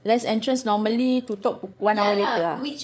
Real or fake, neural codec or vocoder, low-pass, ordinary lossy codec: fake; codec, 16 kHz, 8 kbps, FreqCodec, larger model; none; none